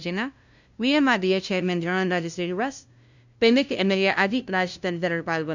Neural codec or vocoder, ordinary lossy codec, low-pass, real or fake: codec, 16 kHz, 0.5 kbps, FunCodec, trained on LibriTTS, 25 frames a second; none; 7.2 kHz; fake